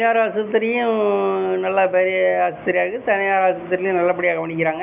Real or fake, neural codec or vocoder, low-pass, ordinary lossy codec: real; none; 3.6 kHz; none